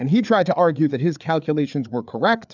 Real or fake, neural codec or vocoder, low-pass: fake; codec, 16 kHz, 4 kbps, FreqCodec, larger model; 7.2 kHz